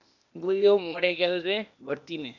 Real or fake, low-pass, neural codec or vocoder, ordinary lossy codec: fake; 7.2 kHz; codec, 16 kHz, 0.8 kbps, ZipCodec; none